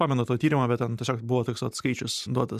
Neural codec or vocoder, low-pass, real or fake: vocoder, 44.1 kHz, 128 mel bands every 512 samples, BigVGAN v2; 14.4 kHz; fake